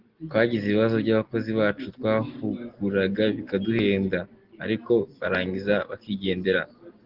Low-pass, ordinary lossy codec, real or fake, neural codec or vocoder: 5.4 kHz; Opus, 16 kbps; real; none